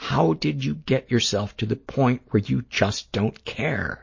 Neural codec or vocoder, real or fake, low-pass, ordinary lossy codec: none; real; 7.2 kHz; MP3, 32 kbps